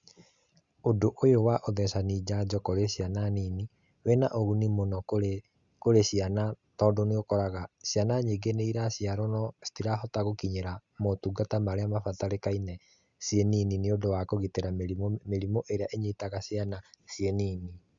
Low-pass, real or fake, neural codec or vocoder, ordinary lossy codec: 7.2 kHz; real; none; Opus, 64 kbps